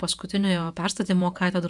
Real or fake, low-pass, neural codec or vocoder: fake; 10.8 kHz; vocoder, 48 kHz, 128 mel bands, Vocos